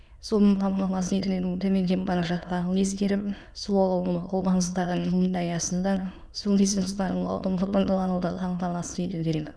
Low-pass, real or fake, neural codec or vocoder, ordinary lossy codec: none; fake; autoencoder, 22.05 kHz, a latent of 192 numbers a frame, VITS, trained on many speakers; none